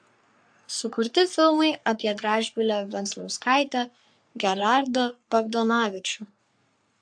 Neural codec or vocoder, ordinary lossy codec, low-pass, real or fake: codec, 44.1 kHz, 3.4 kbps, Pupu-Codec; AAC, 64 kbps; 9.9 kHz; fake